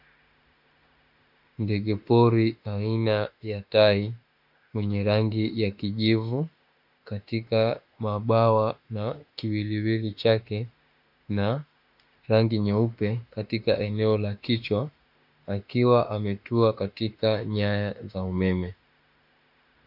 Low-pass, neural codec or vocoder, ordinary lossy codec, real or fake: 5.4 kHz; autoencoder, 48 kHz, 32 numbers a frame, DAC-VAE, trained on Japanese speech; MP3, 48 kbps; fake